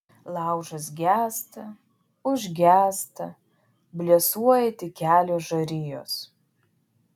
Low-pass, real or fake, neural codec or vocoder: 19.8 kHz; real; none